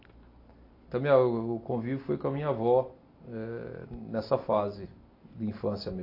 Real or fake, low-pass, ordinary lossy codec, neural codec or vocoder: real; 5.4 kHz; AAC, 32 kbps; none